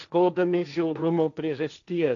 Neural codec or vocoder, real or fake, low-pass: codec, 16 kHz, 1.1 kbps, Voila-Tokenizer; fake; 7.2 kHz